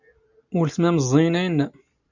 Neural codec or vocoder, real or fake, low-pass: none; real; 7.2 kHz